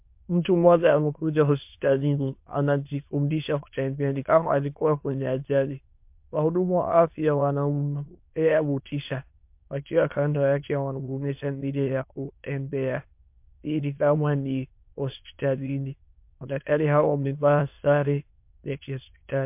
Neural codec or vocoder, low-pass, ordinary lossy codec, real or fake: autoencoder, 22.05 kHz, a latent of 192 numbers a frame, VITS, trained on many speakers; 3.6 kHz; MP3, 32 kbps; fake